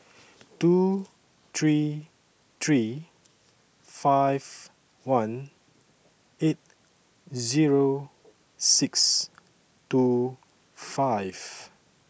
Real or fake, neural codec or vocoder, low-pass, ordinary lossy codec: real; none; none; none